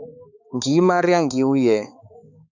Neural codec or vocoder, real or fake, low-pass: codec, 16 kHz, 4 kbps, X-Codec, HuBERT features, trained on balanced general audio; fake; 7.2 kHz